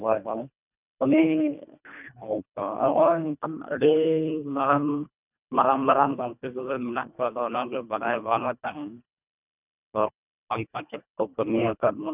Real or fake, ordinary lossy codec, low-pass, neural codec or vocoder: fake; none; 3.6 kHz; codec, 24 kHz, 1.5 kbps, HILCodec